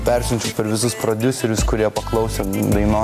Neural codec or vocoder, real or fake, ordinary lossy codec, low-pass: none; real; AAC, 64 kbps; 14.4 kHz